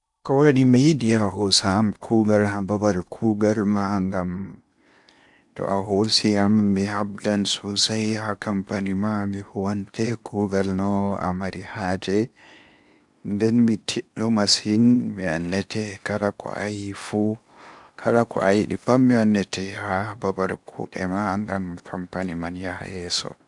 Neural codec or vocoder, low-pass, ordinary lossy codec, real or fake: codec, 16 kHz in and 24 kHz out, 0.8 kbps, FocalCodec, streaming, 65536 codes; 10.8 kHz; none; fake